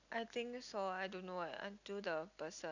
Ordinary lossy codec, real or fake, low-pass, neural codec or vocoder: none; real; 7.2 kHz; none